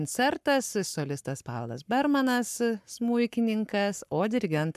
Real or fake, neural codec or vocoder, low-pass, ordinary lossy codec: fake; codec, 44.1 kHz, 7.8 kbps, Pupu-Codec; 14.4 kHz; MP3, 96 kbps